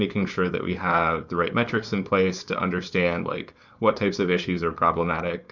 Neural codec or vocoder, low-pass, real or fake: codec, 16 kHz, 4.8 kbps, FACodec; 7.2 kHz; fake